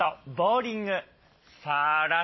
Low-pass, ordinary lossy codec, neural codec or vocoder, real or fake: 7.2 kHz; MP3, 24 kbps; none; real